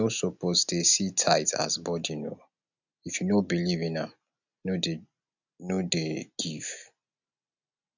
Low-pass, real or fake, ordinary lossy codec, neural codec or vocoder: 7.2 kHz; real; none; none